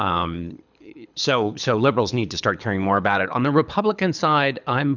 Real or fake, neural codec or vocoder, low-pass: fake; codec, 24 kHz, 6 kbps, HILCodec; 7.2 kHz